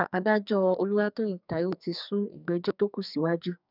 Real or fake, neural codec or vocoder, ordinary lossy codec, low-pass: fake; codec, 44.1 kHz, 2.6 kbps, SNAC; none; 5.4 kHz